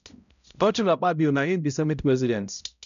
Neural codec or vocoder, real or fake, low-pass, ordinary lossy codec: codec, 16 kHz, 0.5 kbps, X-Codec, HuBERT features, trained on balanced general audio; fake; 7.2 kHz; none